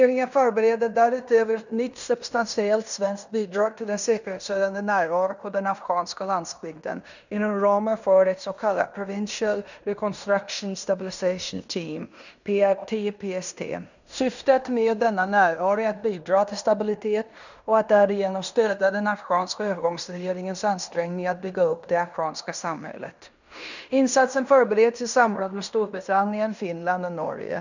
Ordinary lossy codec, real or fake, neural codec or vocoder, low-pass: none; fake; codec, 16 kHz in and 24 kHz out, 0.9 kbps, LongCat-Audio-Codec, fine tuned four codebook decoder; 7.2 kHz